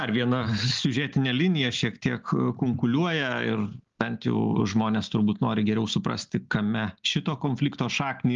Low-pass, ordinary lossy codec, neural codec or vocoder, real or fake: 7.2 kHz; Opus, 32 kbps; none; real